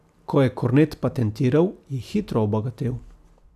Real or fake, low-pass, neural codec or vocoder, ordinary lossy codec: real; 14.4 kHz; none; none